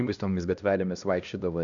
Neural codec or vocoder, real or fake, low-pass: codec, 16 kHz, 1 kbps, X-Codec, HuBERT features, trained on LibriSpeech; fake; 7.2 kHz